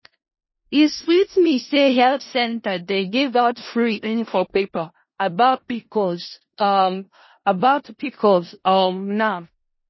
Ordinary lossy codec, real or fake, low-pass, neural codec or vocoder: MP3, 24 kbps; fake; 7.2 kHz; codec, 16 kHz in and 24 kHz out, 0.4 kbps, LongCat-Audio-Codec, four codebook decoder